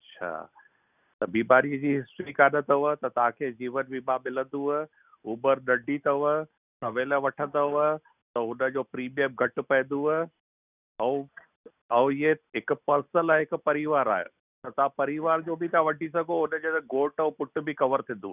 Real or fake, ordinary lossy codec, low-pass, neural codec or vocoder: real; none; 3.6 kHz; none